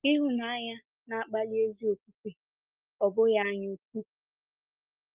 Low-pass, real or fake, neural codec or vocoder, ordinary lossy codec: 3.6 kHz; real; none; Opus, 32 kbps